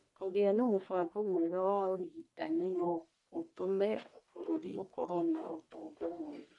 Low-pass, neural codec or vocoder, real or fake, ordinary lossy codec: 10.8 kHz; codec, 44.1 kHz, 1.7 kbps, Pupu-Codec; fake; none